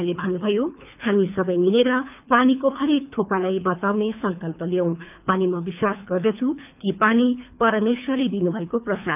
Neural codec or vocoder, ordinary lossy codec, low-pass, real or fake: codec, 24 kHz, 3 kbps, HILCodec; none; 3.6 kHz; fake